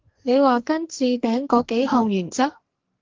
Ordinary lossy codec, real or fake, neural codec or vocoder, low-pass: Opus, 16 kbps; fake; codec, 44.1 kHz, 2.6 kbps, SNAC; 7.2 kHz